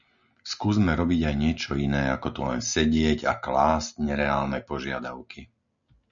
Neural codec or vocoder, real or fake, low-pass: none; real; 7.2 kHz